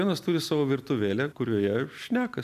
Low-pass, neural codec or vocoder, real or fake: 14.4 kHz; none; real